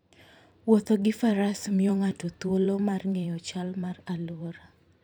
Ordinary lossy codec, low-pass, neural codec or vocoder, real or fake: none; none; vocoder, 44.1 kHz, 128 mel bands every 512 samples, BigVGAN v2; fake